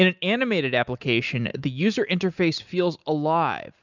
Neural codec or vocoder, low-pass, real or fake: none; 7.2 kHz; real